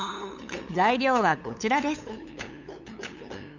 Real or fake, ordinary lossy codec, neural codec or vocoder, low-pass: fake; none; codec, 16 kHz, 8 kbps, FunCodec, trained on LibriTTS, 25 frames a second; 7.2 kHz